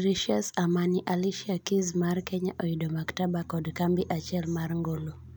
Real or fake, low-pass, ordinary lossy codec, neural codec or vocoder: real; none; none; none